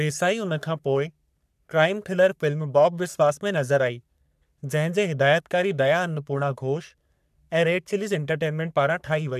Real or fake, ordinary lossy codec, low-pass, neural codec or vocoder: fake; none; 14.4 kHz; codec, 44.1 kHz, 3.4 kbps, Pupu-Codec